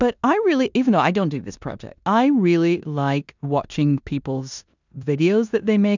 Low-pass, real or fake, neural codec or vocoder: 7.2 kHz; fake; codec, 16 kHz in and 24 kHz out, 0.9 kbps, LongCat-Audio-Codec, four codebook decoder